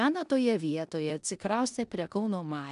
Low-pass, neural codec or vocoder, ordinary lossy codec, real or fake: 10.8 kHz; codec, 16 kHz in and 24 kHz out, 0.9 kbps, LongCat-Audio-Codec, four codebook decoder; MP3, 96 kbps; fake